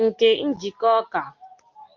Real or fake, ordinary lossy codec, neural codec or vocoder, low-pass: real; Opus, 24 kbps; none; 7.2 kHz